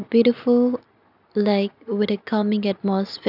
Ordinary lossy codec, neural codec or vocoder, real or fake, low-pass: none; none; real; 5.4 kHz